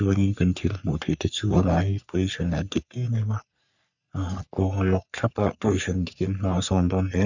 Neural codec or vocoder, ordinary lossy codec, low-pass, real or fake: codec, 44.1 kHz, 3.4 kbps, Pupu-Codec; none; 7.2 kHz; fake